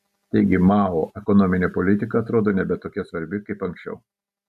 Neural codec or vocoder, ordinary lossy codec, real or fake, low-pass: none; MP3, 96 kbps; real; 14.4 kHz